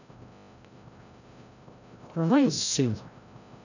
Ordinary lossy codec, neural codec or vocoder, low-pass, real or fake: none; codec, 16 kHz, 0.5 kbps, FreqCodec, larger model; 7.2 kHz; fake